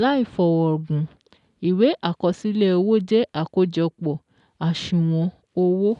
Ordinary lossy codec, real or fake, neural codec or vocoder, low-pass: none; real; none; 10.8 kHz